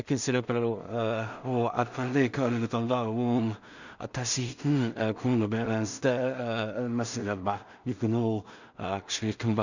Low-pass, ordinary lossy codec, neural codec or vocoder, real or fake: 7.2 kHz; none; codec, 16 kHz in and 24 kHz out, 0.4 kbps, LongCat-Audio-Codec, two codebook decoder; fake